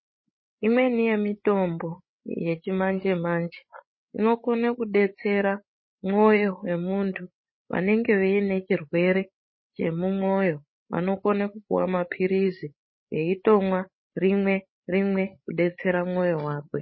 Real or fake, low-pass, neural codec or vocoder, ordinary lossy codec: fake; 7.2 kHz; codec, 16 kHz, 16 kbps, FreqCodec, larger model; MP3, 24 kbps